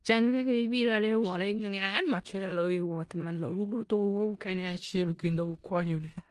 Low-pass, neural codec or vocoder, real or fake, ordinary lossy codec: 10.8 kHz; codec, 16 kHz in and 24 kHz out, 0.4 kbps, LongCat-Audio-Codec, four codebook decoder; fake; Opus, 32 kbps